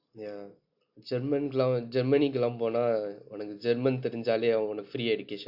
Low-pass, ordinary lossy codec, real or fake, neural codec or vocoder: 5.4 kHz; AAC, 48 kbps; real; none